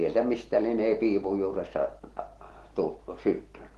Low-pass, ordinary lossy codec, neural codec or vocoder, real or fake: 10.8 kHz; Opus, 16 kbps; none; real